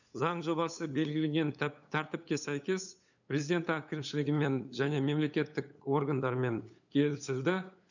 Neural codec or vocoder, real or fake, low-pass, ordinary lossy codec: codec, 16 kHz, 8 kbps, FunCodec, trained on LibriTTS, 25 frames a second; fake; 7.2 kHz; none